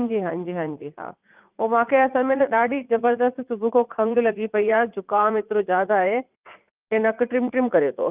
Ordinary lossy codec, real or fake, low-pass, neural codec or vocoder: Opus, 24 kbps; fake; 3.6 kHz; vocoder, 44.1 kHz, 80 mel bands, Vocos